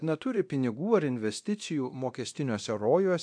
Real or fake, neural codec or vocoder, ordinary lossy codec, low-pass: fake; codec, 24 kHz, 0.9 kbps, DualCodec; AAC, 64 kbps; 9.9 kHz